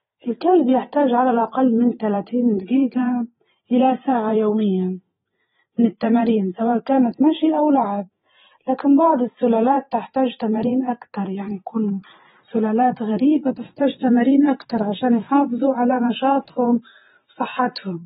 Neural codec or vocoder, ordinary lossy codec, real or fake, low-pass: vocoder, 44.1 kHz, 128 mel bands every 512 samples, BigVGAN v2; AAC, 16 kbps; fake; 19.8 kHz